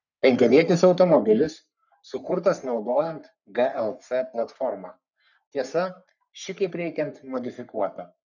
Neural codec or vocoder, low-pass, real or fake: codec, 44.1 kHz, 3.4 kbps, Pupu-Codec; 7.2 kHz; fake